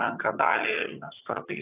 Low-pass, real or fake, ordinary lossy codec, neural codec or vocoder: 3.6 kHz; fake; AAC, 24 kbps; vocoder, 22.05 kHz, 80 mel bands, HiFi-GAN